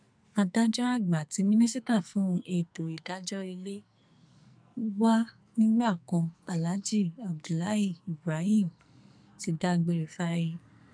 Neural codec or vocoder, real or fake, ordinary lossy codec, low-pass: codec, 44.1 kHz, 2.6 kbps, SNAC; fake; none; 9.9 kHz